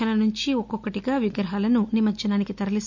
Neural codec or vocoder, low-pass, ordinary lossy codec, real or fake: none; 7.2 kHz; MP3, 64 kbps; real